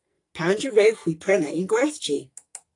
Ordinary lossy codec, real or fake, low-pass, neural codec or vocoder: AAC, 48 kbps; fake; 10.8 kHz; codec, 44.1 kHz, 2.6 kbps, SNAC